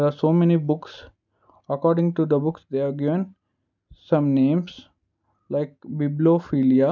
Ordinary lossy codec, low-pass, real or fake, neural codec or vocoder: none; 7.2 kHz; real; none